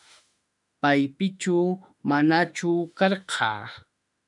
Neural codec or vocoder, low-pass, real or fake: autoencoder, 48 kHz, 32 numbers a frame, DAC-VAE, trained on Japanese speech; 10.8 kHz; fake